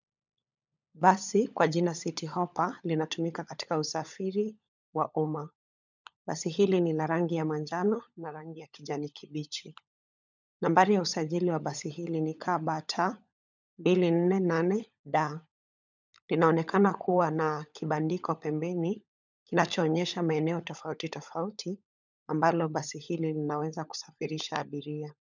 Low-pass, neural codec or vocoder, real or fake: 7.2 kHz; codec, 16 kHz, 16 kbps, FunCodec, trained on LibriTTS, 50 frames a second; fake